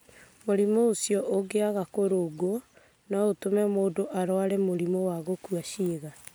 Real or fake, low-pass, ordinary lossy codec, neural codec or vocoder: real; none; none; none